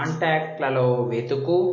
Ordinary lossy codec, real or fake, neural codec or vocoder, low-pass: MP3, 32 kbps; real; none; 7.2 kHz